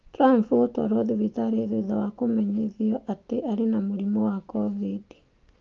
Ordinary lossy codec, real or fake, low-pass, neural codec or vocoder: Opus, 24 kbps; real; 7.2 kHz; none